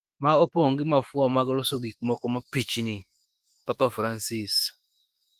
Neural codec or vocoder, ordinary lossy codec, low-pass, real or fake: autoencoder, 48 kHz, 32 numbers a frame, DAC-VAE, trained on Japanese speech; Opus, 24 kbps; 14.4 kHz; fake